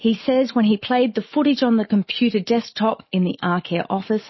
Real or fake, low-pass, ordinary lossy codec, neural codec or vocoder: real; 7.2 kHz; MP3, 24 kbps; none